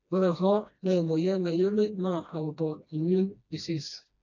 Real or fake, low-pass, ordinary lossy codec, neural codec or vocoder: fake; 7.2 kHz; none; codec, 16 kHz, 1 kbps, FreqCodec, smaller model